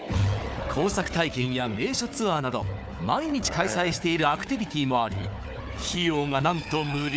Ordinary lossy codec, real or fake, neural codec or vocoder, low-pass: none; fake; codec, 16 kHz, 4 kbps, FunCodec, trained on Chinese and English, 50 frames a second; none